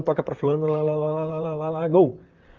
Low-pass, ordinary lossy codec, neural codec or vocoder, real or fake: 7.2 kHz; Opus, 24 kbps; vocoder, 44.1 kHz, 128 mel bands, Pupu-Vocoder; fake